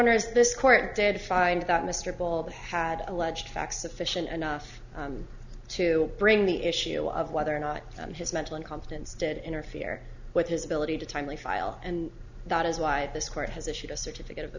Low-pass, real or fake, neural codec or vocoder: 7.2 kHz; real; none